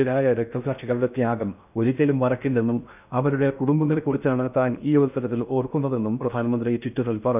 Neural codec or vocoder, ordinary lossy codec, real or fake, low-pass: codec, 16 kHz in and 24 kHz out, 0.6 kbps, FocalCodec, streaming, 2048 codes; none; fake; 3.6 kHz